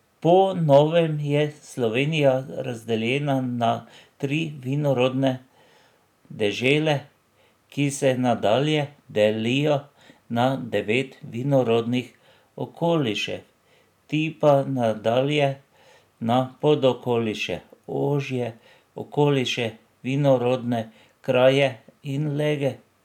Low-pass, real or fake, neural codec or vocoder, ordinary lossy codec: 19.8 kHz; real; none; none